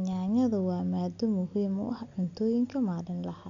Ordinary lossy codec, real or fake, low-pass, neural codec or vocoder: MP3, 64 kbps; real; 7.2 kHz; none